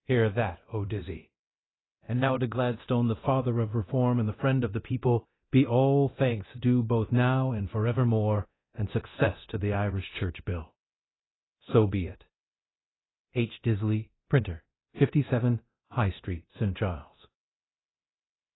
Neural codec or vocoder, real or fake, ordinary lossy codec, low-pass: codec, 24 kHz, 0.9 kbps, DualCodec; fake; AAC, 16 kbps; 7.2 kHz